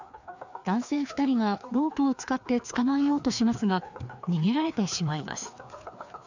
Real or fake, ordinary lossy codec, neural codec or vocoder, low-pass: fake; none; codec, 16 kHz, 2 kbps, FreqCodec, larger model; 7.2 kHz